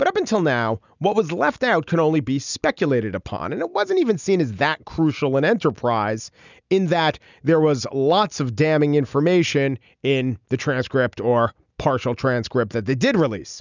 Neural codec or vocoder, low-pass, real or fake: none; 7.2 kHz; real